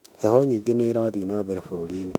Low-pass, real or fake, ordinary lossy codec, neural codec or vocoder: 19.8 kHz; fake; none; autoencoder, 48 kHz, 32 numbers a frame, DAC-VAE, trained on Japanese speech